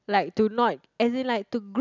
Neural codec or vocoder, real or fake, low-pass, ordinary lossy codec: none; real; 7.2 kHz; none